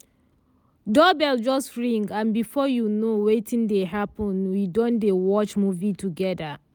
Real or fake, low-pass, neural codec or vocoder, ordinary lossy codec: real; none; none; none